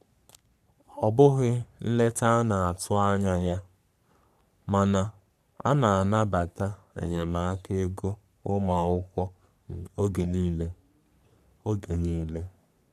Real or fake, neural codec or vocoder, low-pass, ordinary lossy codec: fake; codec, 44.1 kHz, 3.4 kbps, Pupu-Codec; 14.4 kHz; none